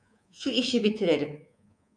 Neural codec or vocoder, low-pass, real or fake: codec, 24 kHz, 3.1 kbps, DualCodec; 9.9 kHz; fake